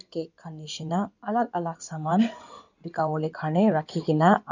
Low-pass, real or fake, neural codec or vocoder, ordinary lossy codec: 7.2 kHz; fake; codec, 16 kHz in and 24 kHz out, 2.2 kbps, FireRedTTS-2 codec; none